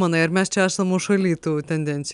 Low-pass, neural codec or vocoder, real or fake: 10.8 kHz; none; real